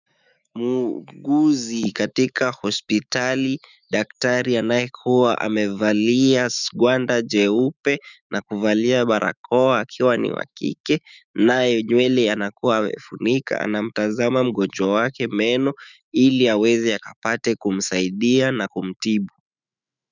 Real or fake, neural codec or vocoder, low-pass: fake; autoencoder, 48 kHz, 128 numbers a frame, DAC-VAE, trained on Japanese speech; 7.2 kHz